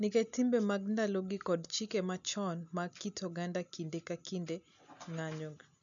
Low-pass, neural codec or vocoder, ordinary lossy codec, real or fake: 7.2 kHz; none; none; real